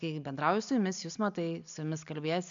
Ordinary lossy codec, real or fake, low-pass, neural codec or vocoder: MP3, 64 kbps; real; 7.2 kHz; none